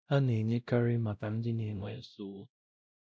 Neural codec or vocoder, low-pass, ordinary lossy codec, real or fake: codec, 16 kHz, 0.5 kbps, X-Codec, WavLM features, trained on Multilingual LibriSpeech; none; none; fake